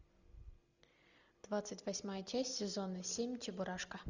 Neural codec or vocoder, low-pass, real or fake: none; 7.2 kHz; real